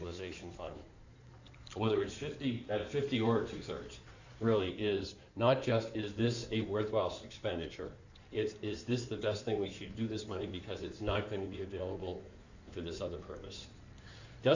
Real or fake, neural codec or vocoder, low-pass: fake; codec, 16 kHz in and 24 kHz out, 2.2 kbps, FireRedTTS-2 codec; 7.2 kHz